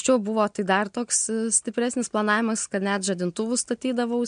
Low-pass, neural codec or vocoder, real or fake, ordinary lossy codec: 9.9 kHz; none; real; MP3, 64 kbps